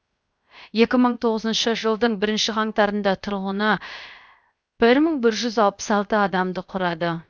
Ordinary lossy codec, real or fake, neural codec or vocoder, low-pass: none; fake; codec, 16 kHz, 0.7 kbps, FocalCodec; none